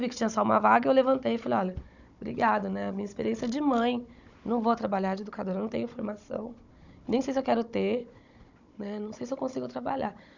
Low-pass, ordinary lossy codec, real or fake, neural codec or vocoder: 7.2 kHz; none; fake; codec, 16 kHz, 16 kbps, FunCodec, trained on Chinese and English, 50 frames a second